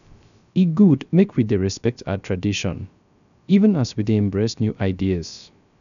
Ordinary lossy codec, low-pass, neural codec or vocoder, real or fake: none; 7.2 kHz; codec, 16 kHz, 0.3 kbps, FocalCodec; fake